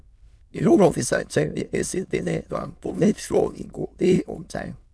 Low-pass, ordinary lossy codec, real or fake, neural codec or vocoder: none; none; fake; autoencoder, 22.05 kHz, a latent of 192 numbers a frame, VITS, trained on many speakers